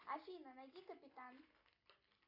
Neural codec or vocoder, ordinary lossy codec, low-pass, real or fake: none; AAC, 32 kbps; 5.4 kHz; real